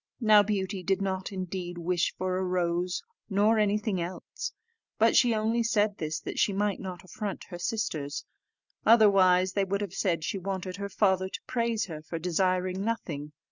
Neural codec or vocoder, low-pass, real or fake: none; 7.2 kHz; real